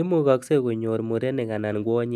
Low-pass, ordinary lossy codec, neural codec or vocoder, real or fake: 14.4 kHz; none; none; real